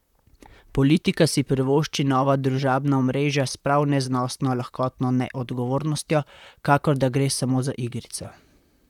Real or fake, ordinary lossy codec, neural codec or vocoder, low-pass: fake; none; vocoder, 44.1 kHz, 128 mel bands, Pupu-Vocoder; 19.8 kHz